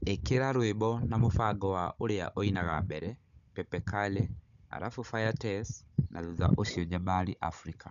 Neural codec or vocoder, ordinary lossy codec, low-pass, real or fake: codec, 16 kHz, 16 kbps, FunCodec, trained on Chinese and English, 50 frames a second; none; 7.2 kHz; fake